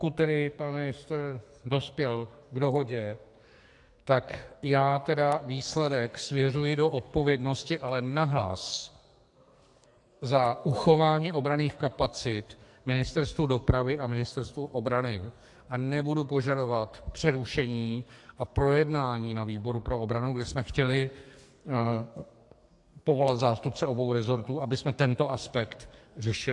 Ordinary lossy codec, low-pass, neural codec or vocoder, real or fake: AAC, 64 kbps; 10.8 kHz; codec, 44.1 kHz, 2.6 kbps, SNAC; fake